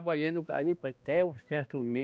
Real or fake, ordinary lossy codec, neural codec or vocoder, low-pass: fake; none; codec, 16 kHz, 1 kbps, X-Codec, HuBERT features, trained on balanced general audio; none